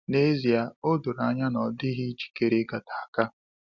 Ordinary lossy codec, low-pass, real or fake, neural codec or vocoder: Opus, 64 kbps; 7.2 kHz; real; none